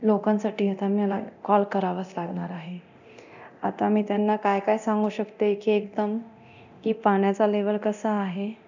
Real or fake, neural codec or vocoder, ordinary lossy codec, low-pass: fake; codec, 24 kHz, 0.9 kbps, DualCodec; none; 7.2 kHz